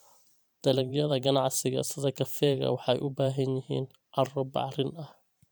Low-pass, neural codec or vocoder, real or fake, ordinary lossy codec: none; vocoder, 44.1 kHz, 128 mel bands every 512 samples, BigVGAN v2; fake; none